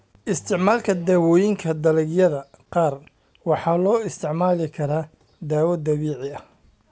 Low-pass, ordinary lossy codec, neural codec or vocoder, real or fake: none; none; none; real